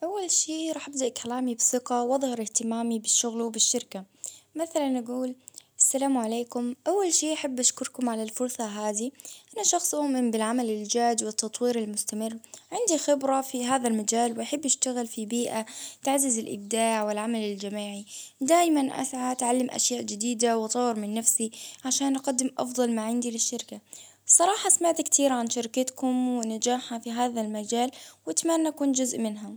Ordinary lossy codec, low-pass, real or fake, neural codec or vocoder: none; none; real; none